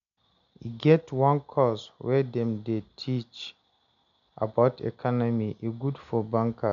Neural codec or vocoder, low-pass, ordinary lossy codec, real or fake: none; 7.2 kHz; none; real